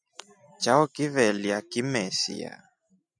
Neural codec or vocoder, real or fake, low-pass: none; real; 9.9 kHz